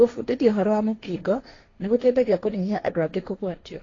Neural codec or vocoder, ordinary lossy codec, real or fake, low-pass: codec, 16 kHz, 1.1 kbps, Voila-Tokenizer; AAC, 32 kbps; fake; 7.2 kHz